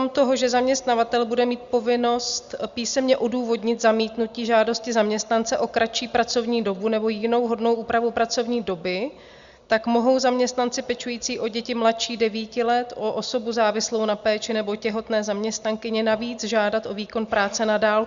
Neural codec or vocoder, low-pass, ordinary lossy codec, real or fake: none; 7.2 kHz; Opus, 64 kbps; real